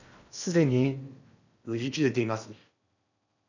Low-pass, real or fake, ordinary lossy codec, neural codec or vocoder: 7.2 kHz; fake; none; codec, 16 kHz in and 24 kHz out, 0.8 kbps, FocalCodec, streaming, 65536 codes